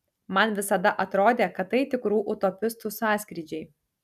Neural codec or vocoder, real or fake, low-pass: none; real; 14.4 kHz